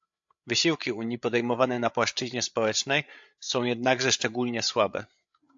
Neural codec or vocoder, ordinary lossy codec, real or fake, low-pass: codec, 16 kHz, 8 kbps, FreqCodec, larger model; AAC, 64 kbps; fake; 7.2 kHz